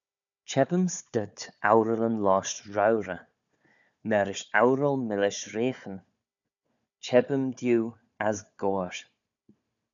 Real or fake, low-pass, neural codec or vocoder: fake; 7.2 kHz; codec, 16 kHz, 4 kbps, FunCodec, trained on Chinese and English, 50 frames a second